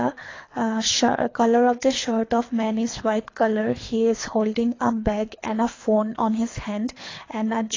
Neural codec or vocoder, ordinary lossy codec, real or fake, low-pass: codec, 24 kHz, 3 kbps, HILCodec; AAC, 32 kbps; fake; 7.2 kHz